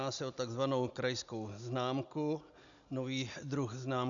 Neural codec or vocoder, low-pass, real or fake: none; 7.2 kHz; real